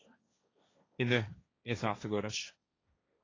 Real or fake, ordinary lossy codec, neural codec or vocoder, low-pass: fake; AAC, 32 kbps; codec, 16 kHz, 1.1 kbps, Voila-Tokenizer; 7.2 kHz